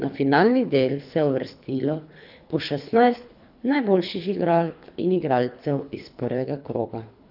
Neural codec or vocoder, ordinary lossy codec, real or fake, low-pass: codec, 24 kHz, 3 kbps, HILCodec; none; fake; 5.4 kHz